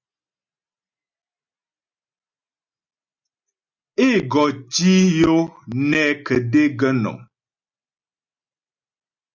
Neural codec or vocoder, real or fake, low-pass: none; real; 7.2 kHz